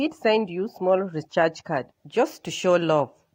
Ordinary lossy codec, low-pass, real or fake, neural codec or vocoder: AAC, 48 kbps; 19.8 kHz; real; none